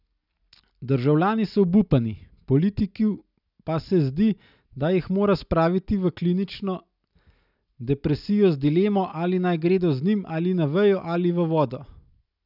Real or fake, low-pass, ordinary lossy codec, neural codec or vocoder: real; 5.4 kHz; none; none